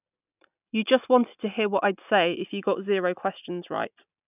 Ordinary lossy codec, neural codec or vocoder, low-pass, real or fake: none; none; 3.6 kHz; real